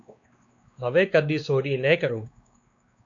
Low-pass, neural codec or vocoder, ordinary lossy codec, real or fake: 7.2 kHz; codec, 16 kHz, 2 kbps, X-Codec, WavLM features, trained on Multilingual LibriSpeech; MP3, 96 kbps; fake